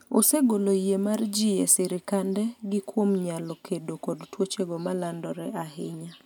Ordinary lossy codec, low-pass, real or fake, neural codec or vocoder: none; none; real; none